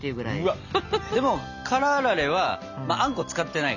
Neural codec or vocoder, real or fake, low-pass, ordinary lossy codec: none; real; 7.2 kHz; none